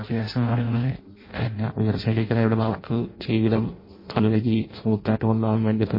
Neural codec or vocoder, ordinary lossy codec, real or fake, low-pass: codec, 16 kHz in and 24 kHz out, 0.6 kbps, FireRedTTS-2 codec; MP3, 24 kbps; fake; 5.4 kHz